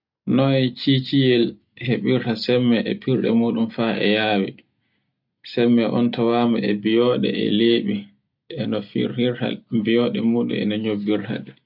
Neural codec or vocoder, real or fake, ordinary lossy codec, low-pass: none; real; none; 5.4 kHz